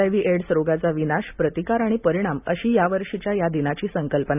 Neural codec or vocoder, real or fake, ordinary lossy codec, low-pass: none; real; none; 3.6 kHz